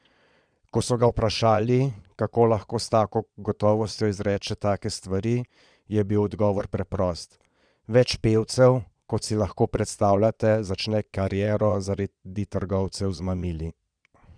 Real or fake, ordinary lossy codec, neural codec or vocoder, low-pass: fake; none; vocoder, 22.05 kHz, 80 mel bands, Vocos; 9.9 kHz